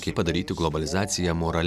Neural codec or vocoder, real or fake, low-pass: none; real; 14.4 kHz